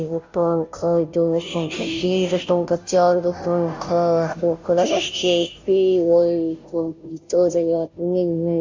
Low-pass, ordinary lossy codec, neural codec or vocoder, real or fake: 7.2 kHz; MP3, 64 kbps; codec, 16 kHz, 0.5 kbps, FunCodec, trained on Chinese and English, 25 frames a second; fake